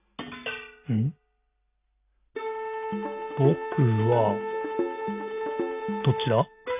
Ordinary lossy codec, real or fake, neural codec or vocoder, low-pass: none; real; none; 3.6 kHz